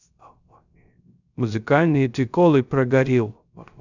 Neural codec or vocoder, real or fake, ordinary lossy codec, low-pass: codec, 16 kHz, 0.3 kbps, FocalCodec; fake; none; 7.2 kHz